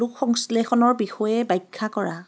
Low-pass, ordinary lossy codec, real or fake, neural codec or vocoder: none; none; real; none